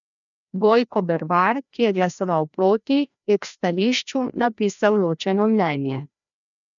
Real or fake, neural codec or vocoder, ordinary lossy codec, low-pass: fake; codec, 16 kHz, 1 kbps, FreqCodec, larger model; none; 7.2 kHz